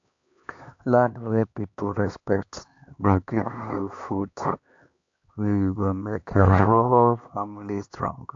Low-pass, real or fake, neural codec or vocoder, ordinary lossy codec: 7.2 kHz; fake; codec, 16 kHz, 2 kbps, X-Codec, HuBERT features, trained on LibriSpeech; AAC, 48 kbps